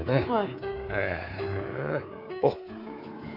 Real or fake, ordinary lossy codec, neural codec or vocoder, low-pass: fake; none; codec, 24 kHz, 3.1 kbps, DualCodec; 5.4 kHz